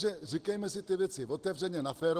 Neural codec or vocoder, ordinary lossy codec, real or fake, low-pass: none; Opus, 16 kbps; real; 14.4 kHz